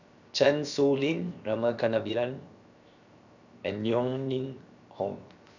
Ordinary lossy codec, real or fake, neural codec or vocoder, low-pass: none; fake; codec, 16 kHz, 0.7 kbps, FocalCodec; 7.2 kHz